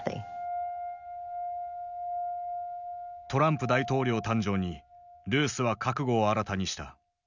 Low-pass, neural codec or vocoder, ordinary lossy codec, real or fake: 7.2 kHz; none; none; real